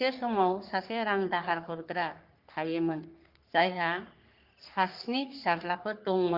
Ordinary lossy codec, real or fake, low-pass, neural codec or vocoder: Opus, 24 kbps; fake; 5.4 kHz; codec, 44.1 kHz, 3.4 kbps, Pupu-Codec